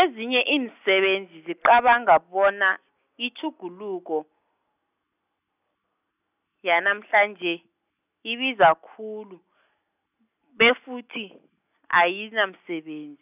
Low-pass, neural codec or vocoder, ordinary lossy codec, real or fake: 3.6 kHz; none; none; real